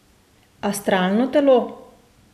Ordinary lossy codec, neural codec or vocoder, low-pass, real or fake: none; none; 14.4 kHz; real